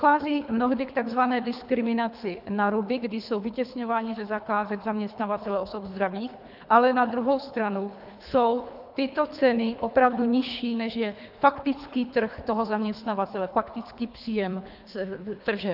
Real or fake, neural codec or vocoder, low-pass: fake; codec, 24 kHz, 3 kbps, HILCodec; 5.4 kHz